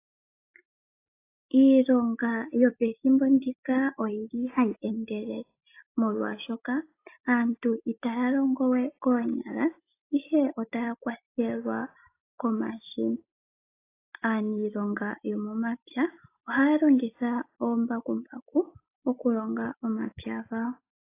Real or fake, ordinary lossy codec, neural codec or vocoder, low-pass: real; AAC, 24 kbps; none; 3.6 kHz